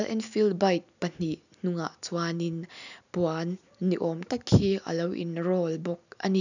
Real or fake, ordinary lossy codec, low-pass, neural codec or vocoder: real; none; 7.2 kHz; none